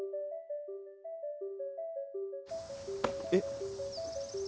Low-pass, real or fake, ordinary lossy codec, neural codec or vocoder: none; real; none; none